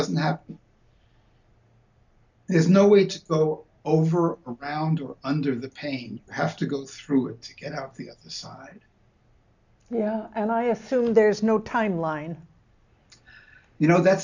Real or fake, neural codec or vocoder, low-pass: real; none; 7.2 kHz